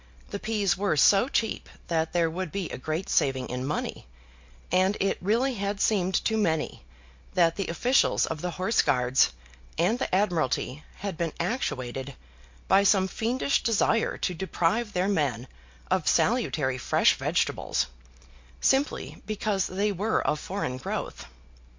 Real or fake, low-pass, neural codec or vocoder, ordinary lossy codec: real; 7.2 kHz; none; MP3, 48 kbps